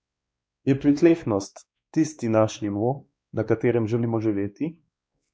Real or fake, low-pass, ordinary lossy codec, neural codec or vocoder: fake; none; none; codec, 16 kHz, 2 kbps, X-Codec, WavLM features, trained on Multilingual LibriSpeech